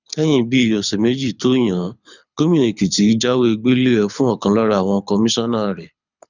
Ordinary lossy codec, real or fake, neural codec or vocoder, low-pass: none; fake; codec, 24 kHz, 6 kbps, HILCodec; 7.2 kHz